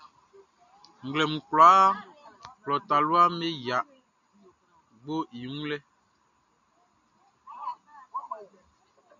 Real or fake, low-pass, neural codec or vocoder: real; 7.2 kHz; none